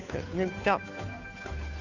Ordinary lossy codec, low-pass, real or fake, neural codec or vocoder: none; 7.2 kHz; fake; codec, 16 kHz, 2 kbps, FunCodec, trained on Chinese and English, 25 frames a second